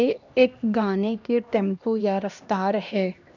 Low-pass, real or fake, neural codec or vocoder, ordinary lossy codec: 7.2 kHz; fake; codec, 16 kHz, 1 kbps, X-Codec, HuBERT features, trained on LibriSpeech; none